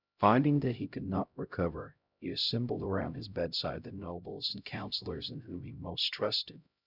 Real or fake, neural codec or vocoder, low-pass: fake; codec, 16 kHz, 0.5 kbps, X-Codec, HuBERT features, trained on LibriSpeech; 5.4 kHz